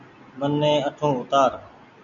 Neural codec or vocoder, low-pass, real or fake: none; 7.2 kHz; real